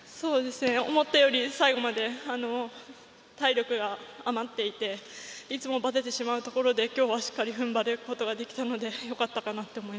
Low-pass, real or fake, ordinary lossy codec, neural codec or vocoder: none; real; none; none